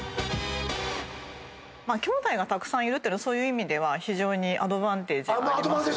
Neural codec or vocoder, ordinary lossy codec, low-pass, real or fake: none; none; none; real